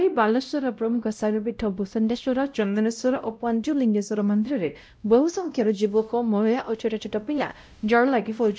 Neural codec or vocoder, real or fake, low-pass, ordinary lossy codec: codec, 16 kHz, 0.5 kbps, X-Codec, WavLM features, trained on Multilingual LibriSpeech; fake; none; none